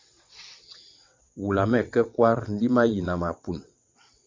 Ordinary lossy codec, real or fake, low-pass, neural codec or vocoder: MP3, 64 kbps; fake; 7.2 kHz; vocoder, 22.05 kHz, 80 mel bands, WaveNeXt